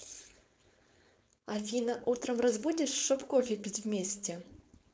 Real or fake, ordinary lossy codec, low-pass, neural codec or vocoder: fake; none; none; codec, 16 kHz, 4.8 kbps, FACodec